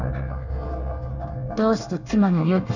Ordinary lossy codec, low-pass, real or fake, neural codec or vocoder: none; 7.2 kHz; fake; codec, 24 kHz, 1 kbps, SNAC